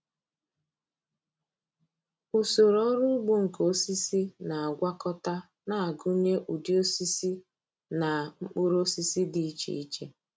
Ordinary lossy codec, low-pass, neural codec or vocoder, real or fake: none; none; none; real